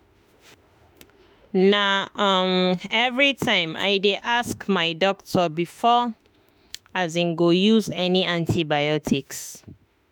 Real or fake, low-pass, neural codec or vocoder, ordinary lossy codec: fake; none; autoencoder, 48 kHz, 32 numbers a frame, DAC-VAE, trained on Japanese speech; none